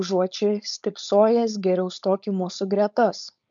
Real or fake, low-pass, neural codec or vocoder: fake; 7.2 kHz; codec, 16 kHz, 4.8 kbps, FACodec